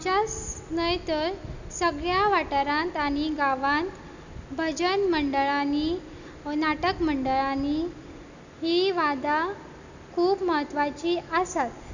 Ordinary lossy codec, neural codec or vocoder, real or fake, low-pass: none; none; real; 7.2 kHz